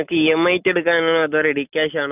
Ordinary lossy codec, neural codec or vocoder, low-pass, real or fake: none; none; 3.6 kHz; real